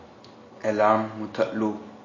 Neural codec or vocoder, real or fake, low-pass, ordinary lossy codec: none; real; 7.2 kHz; MP3, 32 kbps